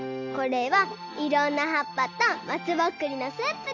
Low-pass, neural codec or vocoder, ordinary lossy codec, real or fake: 7.2 kHz; none; none; real